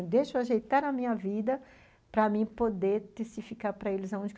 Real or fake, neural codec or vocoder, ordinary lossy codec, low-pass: real; none; none; none